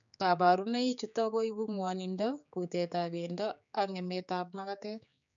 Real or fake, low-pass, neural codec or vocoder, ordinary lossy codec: fake; 7.2 kHz; codec, 16 kHz, 4 kbps, X-Codec, HuBERT features, trained on general audio; none